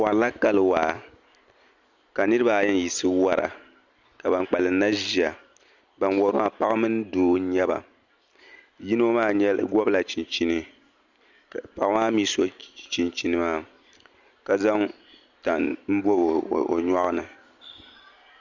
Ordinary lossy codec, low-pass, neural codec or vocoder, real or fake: Opus, 64 kbps; 7.2 kHz; none; real